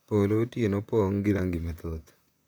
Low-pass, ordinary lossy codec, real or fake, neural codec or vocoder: none; none; fake; vocoder, 44.1 kHz, 128 mel bands, Pupu-Vocoder